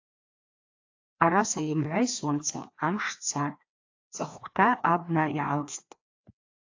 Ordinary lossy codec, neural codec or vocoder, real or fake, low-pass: AAC, 48 kbps; codec, 16 kHz, 2 kbps, FreqCodec, larger model; fake; 7.2 kHz